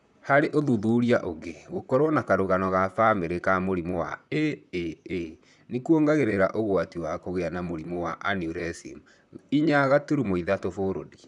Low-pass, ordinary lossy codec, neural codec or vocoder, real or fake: 10.8 kHz; none; vocoder, 44.1 kHz, 128 mel bands, Pupu-Vocoder; fake